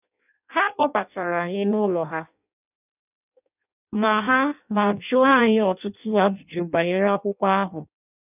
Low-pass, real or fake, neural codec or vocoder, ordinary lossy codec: 3.6 kHz; fake; codec, 16 kHz in and 24 kHz out, 0.6 kbps, FireRedTTS-2 codec; none